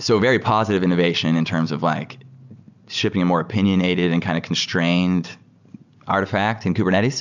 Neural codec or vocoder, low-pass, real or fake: none; 7.2 kHz; real